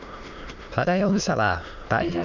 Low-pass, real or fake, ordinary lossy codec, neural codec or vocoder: 7.2 kHz; fake; none; autoencoder, 22.05 kHz, a latent of 192 numbers a frame, VITS, trained on many speakers